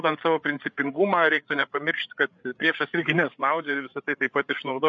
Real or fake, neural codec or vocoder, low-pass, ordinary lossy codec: fake; codec, 16 kHz, 8 kbps, FreqCodec, larger model; 7.2 kHz; MP3, 48 kbps